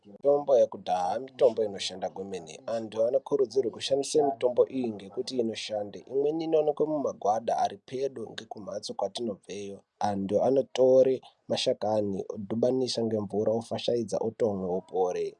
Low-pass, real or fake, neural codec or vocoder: 10.8 kHz; real; none